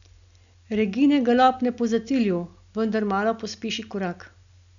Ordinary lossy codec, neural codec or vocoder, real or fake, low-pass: none; none; real; 7.2 kHz